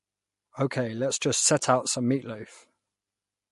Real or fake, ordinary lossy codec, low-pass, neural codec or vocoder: real; MP3, 48 kbps; 14.4 kHz; none